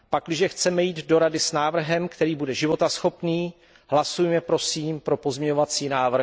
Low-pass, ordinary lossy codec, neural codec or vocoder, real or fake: none; none; none; real